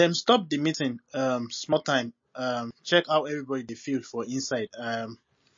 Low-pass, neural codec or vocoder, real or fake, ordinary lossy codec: 7.2 kHz; none; real; MP3, 32 kbps